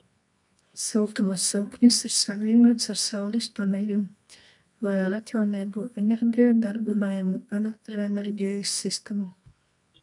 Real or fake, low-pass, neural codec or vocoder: fake; 10.8 kHz; codec, 24 kHz, 0.9 kbps, WavTokenizer, medium music audio release